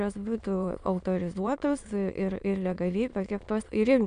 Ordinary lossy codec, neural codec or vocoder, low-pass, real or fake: MP3, 96 kbps; autoencoder, 22.05 kHz, a latent of 192 numbers a frame, VITS, trained on many speakers; 9.9 kHz; fake